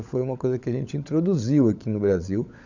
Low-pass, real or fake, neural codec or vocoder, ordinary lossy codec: 7.2 kHz; fake; codec, 16 kHz, 16 kbps, FunCodec, trained on LibriTTS, 50 frames a second; none